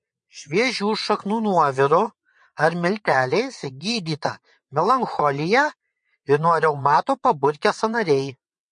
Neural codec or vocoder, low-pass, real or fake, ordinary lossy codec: vocoder, 22.05 kHz, 80 mel bands, WaveNeXt; 9.9 kHz; fake; MP3, 48 kbps